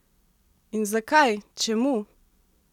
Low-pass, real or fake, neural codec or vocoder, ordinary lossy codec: 19.8 kHz; real; none; none